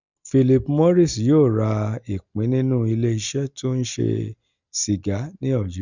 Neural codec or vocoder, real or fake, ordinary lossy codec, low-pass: none; real; none; 7.2 kHz